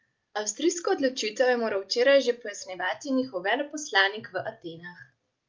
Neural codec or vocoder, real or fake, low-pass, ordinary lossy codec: none; real; 7.2 kHz; Opus, 24 kbps